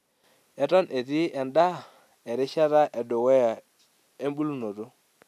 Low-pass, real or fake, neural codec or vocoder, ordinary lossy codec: 14.4 kHz; real; none; none